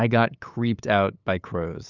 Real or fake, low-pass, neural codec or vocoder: fake; 7.2 kHz; codec, 16 kHz, 8 kbps, FunCodec, trained on LibriTTS, 25 frames a second